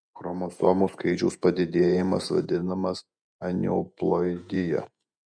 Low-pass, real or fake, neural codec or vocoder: 9.9 kHz; fake; vocoder, 48 kHz, 128 mel bands, Vocos